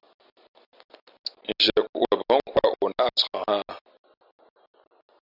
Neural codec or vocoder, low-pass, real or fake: none; 5.4 kHz; real